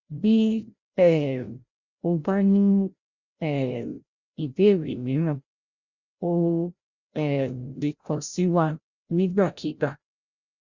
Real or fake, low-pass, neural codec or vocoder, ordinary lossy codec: fake; 7.2 kHz; codec, 16 kHz, 0.5 kbps, FreqCodec, larger model; Opus, 64 kbps